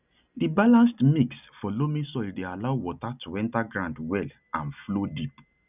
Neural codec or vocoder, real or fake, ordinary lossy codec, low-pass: none; real; none; 3.6 kHz